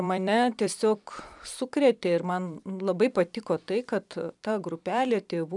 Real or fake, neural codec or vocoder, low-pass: fake; vocoder, 44.1 kHz, 128 mel bands every 256 samples, BigVGAN v2; 10.8 kHz